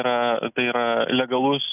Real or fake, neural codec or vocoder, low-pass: real; none; 3.6 kHz